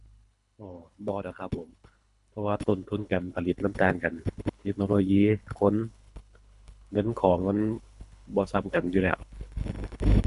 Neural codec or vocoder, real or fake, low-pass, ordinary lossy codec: codec, 24 kHz, 3 kbps, HILCodec; fake; 10.8 kHz; none